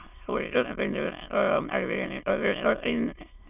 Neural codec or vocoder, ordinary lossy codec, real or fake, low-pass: autoencoder, 22.05 kHz, a latent of 192 numbers a frame, VITS, trained on many speakers; none; fake; 3.6 kHz